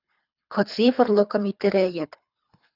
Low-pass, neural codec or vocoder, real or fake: 5.4 kHz; codec, 24 kHz, 3 kbps, HILCodec; fake